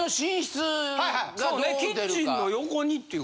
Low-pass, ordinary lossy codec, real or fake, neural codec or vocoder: none; none; real; none